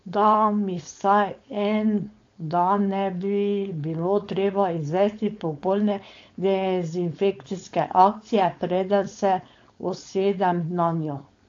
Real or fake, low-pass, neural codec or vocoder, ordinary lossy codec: fake; 7.2 kHz; codec, 16 kHz, 4.8 kbps, FACodec; AAC, 48 kbps